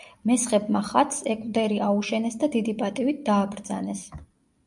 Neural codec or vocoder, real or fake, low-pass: none; real; 10.8 kHz